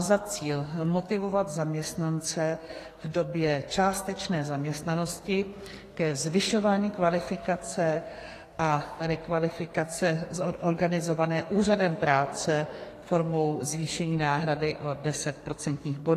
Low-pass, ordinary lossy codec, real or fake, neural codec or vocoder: 14.4 kHz; AAC, 48 kbps; fake; codec, 44.1 kHz, 2.6 kbps, SNAC